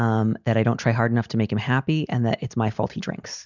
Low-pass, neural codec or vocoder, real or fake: 7.2 kHz; none; real